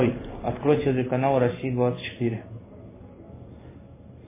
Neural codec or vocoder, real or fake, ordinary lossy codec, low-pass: codec, 16 kHz in and 24 kHz out, 1 kbps, XY-Tokenizer; fake; MP3, 16 kbps; 3.6 kHz